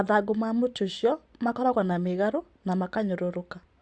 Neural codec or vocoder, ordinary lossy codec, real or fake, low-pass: none; Opus, 64 kbps; real; 9.9 kHz